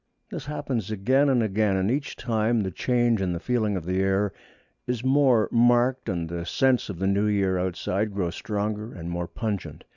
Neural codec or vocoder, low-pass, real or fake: none; 7.2 kHz; real